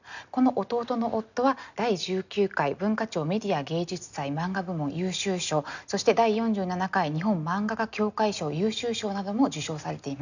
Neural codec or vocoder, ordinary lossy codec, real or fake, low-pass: none; none; real; 7.2 kHz